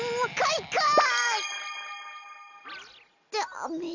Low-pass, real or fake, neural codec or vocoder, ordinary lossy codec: 7.2 kHz; real; none; none